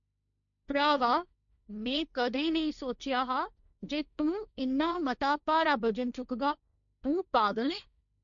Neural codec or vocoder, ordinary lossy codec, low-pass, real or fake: codec, 16 kHz, 1.1 kbps, Voila-Tokenizer; none; 7.2 kHz; fake